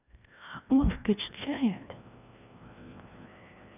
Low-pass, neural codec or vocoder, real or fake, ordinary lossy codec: 3.6 kHz; codec, 16 kHz, 1 kbps, FreqCodec, larger model; fake; none